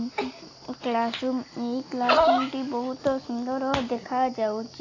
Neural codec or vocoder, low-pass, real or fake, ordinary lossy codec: none; 7.2 kHz; real; AAC, 32 kbps